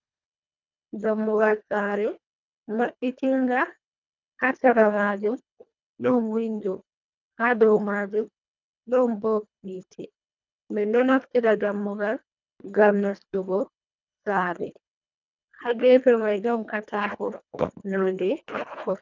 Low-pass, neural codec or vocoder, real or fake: 7.2 kHz; codec, 24 kHz, 1.5 kbps, HILCodec; fake